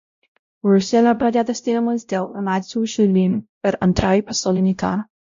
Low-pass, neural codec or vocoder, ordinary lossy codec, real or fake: 7.2 kHz; codec, 16 kHz, 0.5 kbps, X-Codec, WavLM features, trained on Multilingual LibriSpeech; MP3, 48 kbps; fake